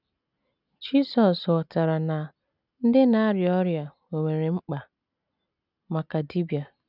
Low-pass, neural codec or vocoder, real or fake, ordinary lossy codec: 5.4 kHz; none; real; none